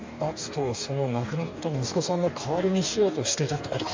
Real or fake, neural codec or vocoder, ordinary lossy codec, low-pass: fake; codec, 44.1 kHz, 2.6 kbps, DAC; MP3, 64 kbps; 7.2 kHz